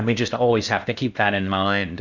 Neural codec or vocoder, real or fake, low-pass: codec, 16 kHz in and 24 kHz out, 0.8 kbps, FocalCodec, streaming, 65536 codes; fake; 7.2 kHz